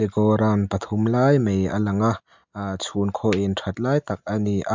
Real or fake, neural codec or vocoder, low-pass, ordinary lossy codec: real; none; 7.2 kHz; MP3, 64 kbps